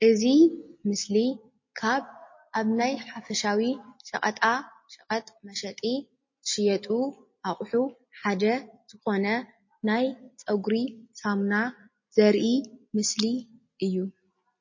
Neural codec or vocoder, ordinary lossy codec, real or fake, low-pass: none; MP3, 32 kbps; real; 7.2 kHz